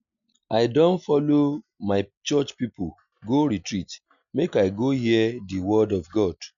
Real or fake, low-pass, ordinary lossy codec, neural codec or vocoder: real; 7.2 kHz; none; none